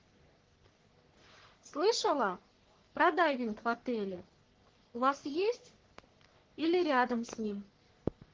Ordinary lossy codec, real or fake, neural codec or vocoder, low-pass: Opus, 16 kbps; fake; codec, 44.1 kHz, 3.4 kbps, Pupu-Codec; 7.2 kHz